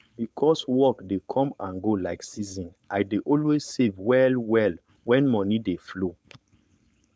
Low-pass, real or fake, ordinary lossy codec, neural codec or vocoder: none; fake; none; codec, 16 kHz, 4.8 kbps, FACodec